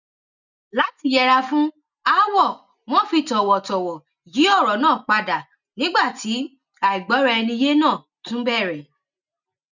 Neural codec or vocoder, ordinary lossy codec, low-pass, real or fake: none; none; 7.2 kHz; real